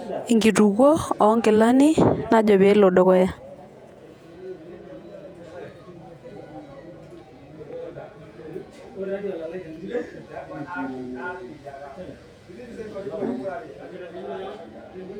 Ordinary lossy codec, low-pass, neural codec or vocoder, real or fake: none; 19.8 kHz; vocoder, 48 kHz, 128 mel bands, Vocos; fake